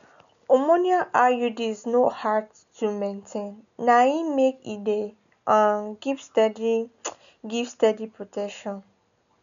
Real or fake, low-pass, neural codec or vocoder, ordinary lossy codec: real; 7.2 kHz; none; none